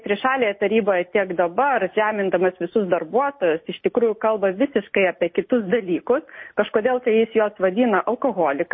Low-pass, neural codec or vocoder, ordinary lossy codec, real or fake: 7.2 kHz; none; MP3, 24 kbps; real